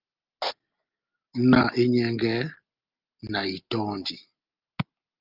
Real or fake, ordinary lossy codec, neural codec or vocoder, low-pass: real; Opus, 24 kbps; none; 5.4 kHz